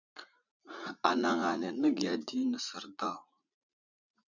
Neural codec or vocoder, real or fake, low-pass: vocoder, 44.1 kHz, 80 mel bands, Vocos; fake; 7.2 kHz